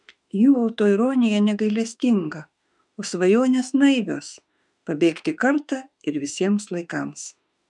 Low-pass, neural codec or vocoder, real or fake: 10.8 kHz; autoencoder, 48 kHz, 32 numbers a frame, DAC-VAE, trained on Japanese speech; fake